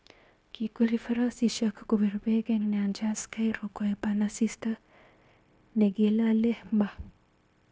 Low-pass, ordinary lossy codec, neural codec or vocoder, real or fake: none; none; codec, 16 kHz, 0.9 kbps, LongCat-Audio-Codec; fake